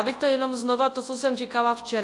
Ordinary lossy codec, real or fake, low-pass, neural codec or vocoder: AAC, 48 kbps; fake; 10.8 kHz; codec, 24 kHz, 0.9 kbps, WavTokenizer, large speech release